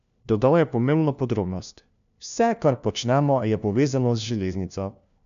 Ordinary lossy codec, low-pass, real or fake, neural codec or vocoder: none; 7.2 kHz; fake; codec, 16 kHz, 1 kbps, FunCodec, trained on LibriTTS, 50 frames a second